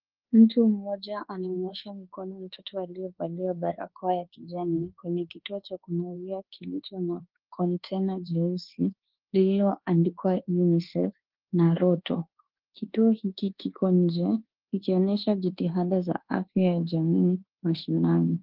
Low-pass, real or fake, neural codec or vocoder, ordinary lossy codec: 5.4 kHz; fake; codec, 24 kHz, 1.2 kbps, DualCodec; Opus, 16 kbps